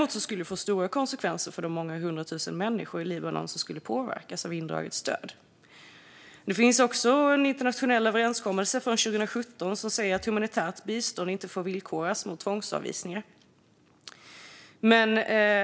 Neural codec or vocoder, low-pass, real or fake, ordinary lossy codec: none; none; real; none